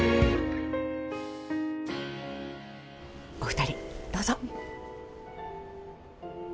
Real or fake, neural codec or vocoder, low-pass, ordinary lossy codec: real; none; none; none